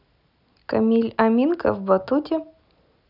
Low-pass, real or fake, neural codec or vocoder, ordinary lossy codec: 5.4 kHz; real; none; none